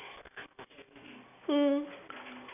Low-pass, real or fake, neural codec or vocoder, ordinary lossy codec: 3.6 kHz; real; none; none